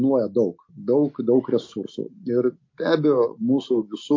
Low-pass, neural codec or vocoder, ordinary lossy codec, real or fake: 7.2 kHz; none; MP3, 32 kbps; real